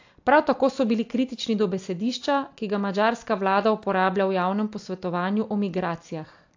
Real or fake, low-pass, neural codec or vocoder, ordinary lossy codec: real; 7.2 kHz; none; AAC, 48 kbps